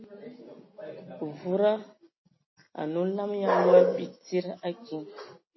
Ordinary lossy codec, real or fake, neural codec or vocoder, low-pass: MP3, 24 kbps; real; none; 7.2 kHz